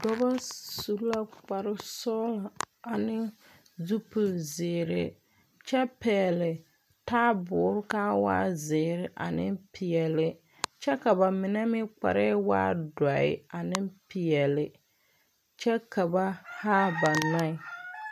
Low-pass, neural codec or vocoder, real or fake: 14.4 kHz; none; real